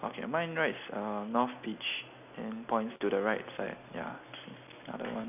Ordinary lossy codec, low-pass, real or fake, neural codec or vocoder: none; 3.6 kHz; real; none